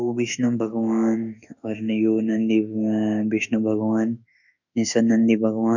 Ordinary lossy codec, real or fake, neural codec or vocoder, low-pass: none; fake; autoencoder, 48 kHz, 32 numbers a frame, DAC-VAE, trained on Japanese speech; 7.2 kHz